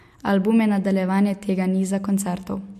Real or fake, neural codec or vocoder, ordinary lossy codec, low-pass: real; none; MP3, 64 kbps; 14.4 kHz